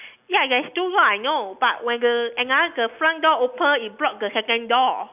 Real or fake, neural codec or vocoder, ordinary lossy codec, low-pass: real; none; none; 3.6 kHz